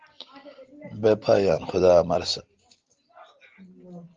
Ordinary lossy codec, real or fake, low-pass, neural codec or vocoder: Opus, 16 kbps; real; 7.2 kHz; none